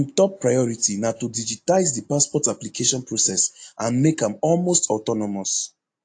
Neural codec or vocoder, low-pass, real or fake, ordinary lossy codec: none; 9.9 kHz; real; AAC, 48 kbps